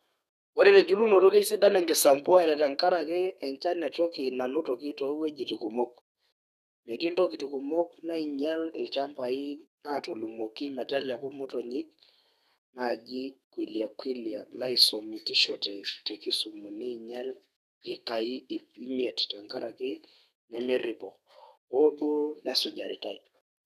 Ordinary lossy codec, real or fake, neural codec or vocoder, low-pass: none; fake; codec, 32 kHz, 1.9 kbps, SNAC; 14.4 kHz